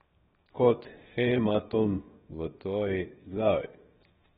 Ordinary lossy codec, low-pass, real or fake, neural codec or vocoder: AAC, 16 kbps; 7.2 kHz; fake; codec, 16 kHz, 0.7 kbps, FocalCodec